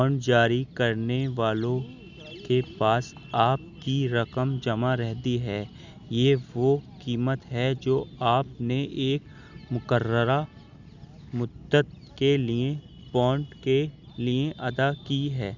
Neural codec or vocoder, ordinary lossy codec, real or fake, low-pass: none; none; real; 7.2 kHz